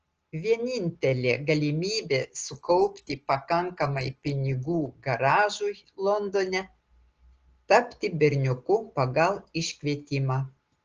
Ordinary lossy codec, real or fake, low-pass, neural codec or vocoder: Opus, 16 kbps; real; 7.2 kHz; none